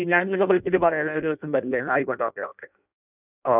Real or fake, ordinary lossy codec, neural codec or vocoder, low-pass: fake; none; codec, 16 kHz in and 24 kHz out, 0.6 kbps, FireRedTTS-2 codec; 3.6 kHz